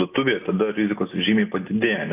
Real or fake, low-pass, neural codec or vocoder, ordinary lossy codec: real; 3.6 kHz; none; AAC, 24 kbps